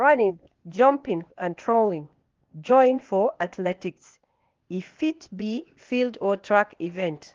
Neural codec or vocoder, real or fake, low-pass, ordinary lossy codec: codec, 16 kHz, 0.8 kbps, ZipCodec; fake; 7.2 kHz; Opus, 32 kbps